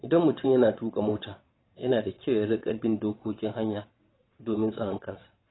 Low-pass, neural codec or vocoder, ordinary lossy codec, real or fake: 7.2 kHz; vocoder, 22.05 kHz, 80 mel bands, WaveNeXt; AAC, 16 kbps; fake